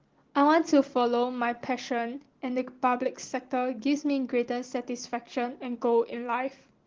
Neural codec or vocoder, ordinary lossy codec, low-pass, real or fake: none; Opus, 16 kbps; 7.2 kHz; real